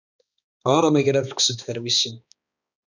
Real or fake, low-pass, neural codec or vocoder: fake; 7.2 kHz; codec, 16 kHz, 2 kbps, X-Codec, HuBERT features, trained on balanced general audio